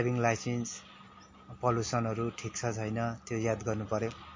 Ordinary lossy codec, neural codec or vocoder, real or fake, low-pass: MP3, 32 kbps; none; real; 7.2 kHz